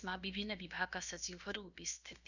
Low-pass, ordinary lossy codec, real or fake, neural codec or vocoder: 7.2 kHz; none; fake; codec, 16 kHz, about 1 kbps, DyCAST, with the encoder's durations